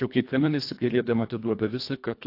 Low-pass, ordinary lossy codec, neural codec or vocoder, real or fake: 5.4 kHz; AAC, 32 kbps; codec, 24 kHz, 1.5 kbps, HILCodec; fake